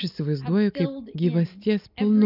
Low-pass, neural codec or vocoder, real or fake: 5.4 kHz; none; real